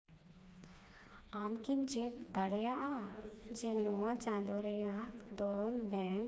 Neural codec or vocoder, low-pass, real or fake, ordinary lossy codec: codec, 16 kHz, 2 kbps, FreqCodec, smaller model; none; fake; none